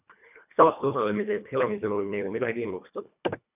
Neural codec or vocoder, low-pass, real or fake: codec, 24 kHz, 1.5 kbps, HILCodec; 3.6 kHz; fake